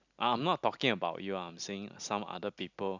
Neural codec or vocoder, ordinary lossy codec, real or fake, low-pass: none; none; real; 7.2 kHz